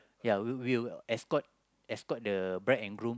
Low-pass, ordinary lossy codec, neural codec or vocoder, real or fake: none; none; none; real